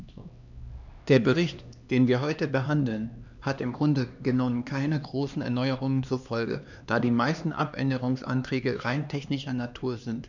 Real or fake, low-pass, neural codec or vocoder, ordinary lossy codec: fake; 7.2 kHz; codec, 16 kHz, 2 kbps, X-Codec, HuBERT features, trained on LibriSpeech; none